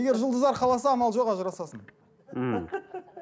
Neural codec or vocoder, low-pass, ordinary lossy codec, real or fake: none; none; none; real